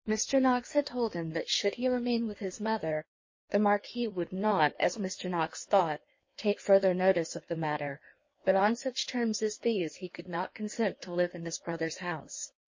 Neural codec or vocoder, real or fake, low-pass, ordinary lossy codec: codec, 16 kHz in and 24 kHz out, 1.1 kbps, FireRedTTS-2 codec; fake; 7.2 kHz; MP3, 32 kbps